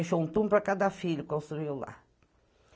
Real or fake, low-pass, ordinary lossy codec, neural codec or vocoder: real; none; none; none